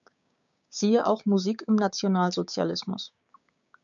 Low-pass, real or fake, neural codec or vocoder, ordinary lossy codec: 7.2 kHz; fake; codec, 16 kHz, 6 kbps, DAC; MP3, 96 kbps